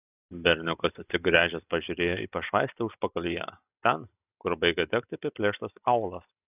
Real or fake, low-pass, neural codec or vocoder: real; 3.6 kHz; none